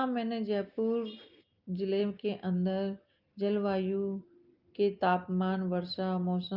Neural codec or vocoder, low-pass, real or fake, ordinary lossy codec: none; 5.4 kHz; real; Opus, 24 kbps